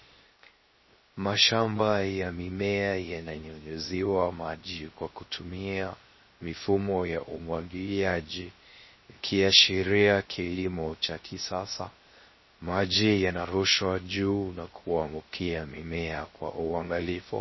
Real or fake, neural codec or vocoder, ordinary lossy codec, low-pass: fake; codec, 16 kHz, 0.3 kbps, FocalCodec; MP3, 24 kbps; 7.2 kHz